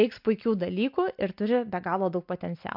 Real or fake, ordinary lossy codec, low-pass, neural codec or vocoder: real; MP3, 48 kbps; 5.4 kHz; none